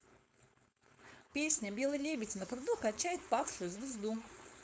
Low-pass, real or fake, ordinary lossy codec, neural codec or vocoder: none; fake; none; codec, 16 kHz, 4.8 kbps, FACodec